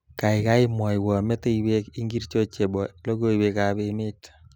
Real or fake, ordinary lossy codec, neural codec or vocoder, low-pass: real; none; none; none